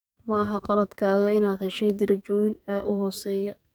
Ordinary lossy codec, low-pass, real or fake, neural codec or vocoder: none; none; fake; codec, 44.1 kHz, 2.6 kbps, SNAC